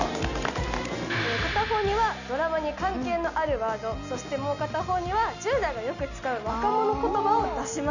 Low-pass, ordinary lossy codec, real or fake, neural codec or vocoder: 7.2 kHz; none; real; none